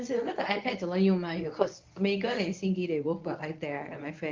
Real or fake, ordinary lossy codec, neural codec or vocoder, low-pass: fake; Opus, 24 kbps; codec, 24 kHz, 0.9 kbps, WavTokenizer, medium speech release version 1; 7.2 kHz